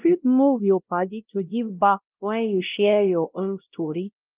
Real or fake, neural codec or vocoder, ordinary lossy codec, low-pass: fake; codec, 16 kHz, 0.5 kbps, X-Codec, WavLM features, trained on Multilingual LibriSpeech; Opus, 24 kbps; 3.6 kHz